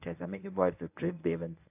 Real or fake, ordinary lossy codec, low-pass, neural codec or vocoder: fake; AAC, 32 kbps; 3.6 kHz; codec, 24 kHz, 0.9 kbps, WavTokenizer, medium speech release version 1